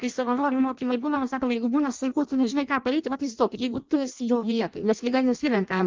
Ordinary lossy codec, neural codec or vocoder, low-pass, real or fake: Opus, 24 kbps; codec, 16 kHz in and 24 kHz out, 0.6 kbps, FireRedTTS-2 codec; 7.2 kHz; fake